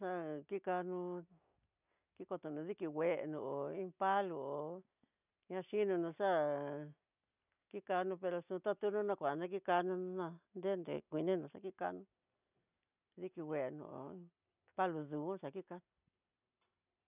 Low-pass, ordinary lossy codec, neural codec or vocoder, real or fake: 3.6 kHz; none; none; real